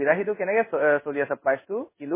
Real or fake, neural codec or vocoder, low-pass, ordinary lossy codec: fake; codec, 16 kHz in and 24 kHz out, 1 kbps, XY-Tokenizer; 3.6 kHz; MP3, 16 kbps